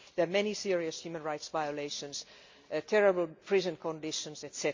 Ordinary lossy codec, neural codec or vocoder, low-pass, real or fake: MP3, 64 kbps; none; 7.2 kHz; real